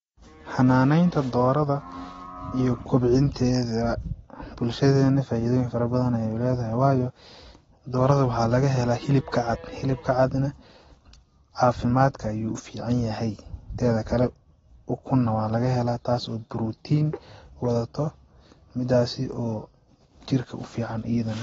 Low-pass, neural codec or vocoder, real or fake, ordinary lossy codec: 19.8 kHz; none; real; AAC, 24 kbps